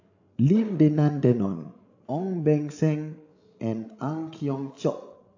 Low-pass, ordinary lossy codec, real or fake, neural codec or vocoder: 7.2 kHz; AAC, 48 kbps; fake; codec, 16 kHz, 16 kbps, FreqCodec, larger model